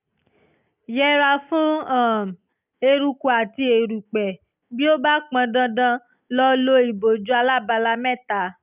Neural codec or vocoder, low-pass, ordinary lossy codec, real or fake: none; 3.6 kHz; none; real